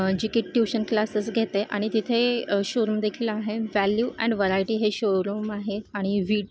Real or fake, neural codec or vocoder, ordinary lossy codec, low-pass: real; none; none; none